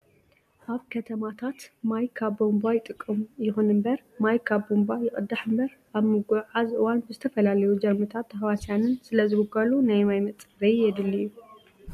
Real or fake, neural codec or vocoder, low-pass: real; none; 14.4 kHz